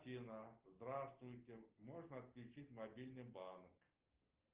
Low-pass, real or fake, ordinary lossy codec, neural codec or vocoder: 3.6 kHz; real; Opus, 32 kbps; none